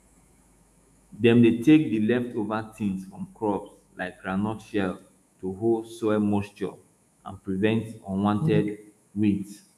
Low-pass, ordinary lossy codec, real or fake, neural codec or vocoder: 14.4 kHz; none; fake; autoencoder, 48 kHz, 128 numbers a frame, DAC-VAE, trained on Japanese speech